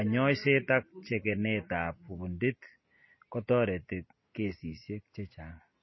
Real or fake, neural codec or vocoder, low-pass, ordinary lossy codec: real; none; 7.2 kHz; MP3, 24 kbps